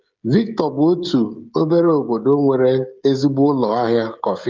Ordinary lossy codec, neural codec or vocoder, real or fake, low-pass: Opus, 24 kbps; codec, 16 kHz, 16 kbps, FreqCodec, smaller model; fake; 7.2 kHz